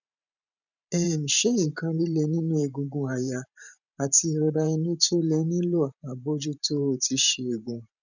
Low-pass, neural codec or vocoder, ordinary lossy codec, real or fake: 7.2 kHz; vocoder, 44.1 kHz, 128 mel bands every 512 samples, BigVGAN v2; none; fake